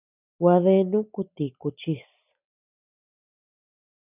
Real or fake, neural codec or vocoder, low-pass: real; none; 3.6 kHz